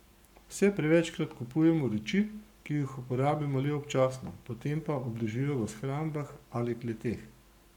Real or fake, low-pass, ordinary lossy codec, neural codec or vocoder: fake; 19.8 kHz; none; codec, 44.1 kHz, 7.8 kbps, Pupu-Codec